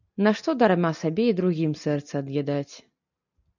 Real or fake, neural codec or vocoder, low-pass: real; none; 7.2 kHz